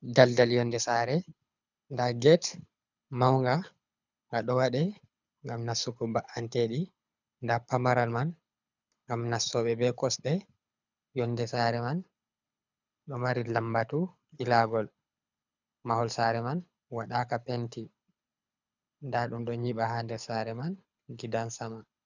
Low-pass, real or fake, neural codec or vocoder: 7.2 kHz; fake; codec, 24 kHz, 6 kbps, HILCodec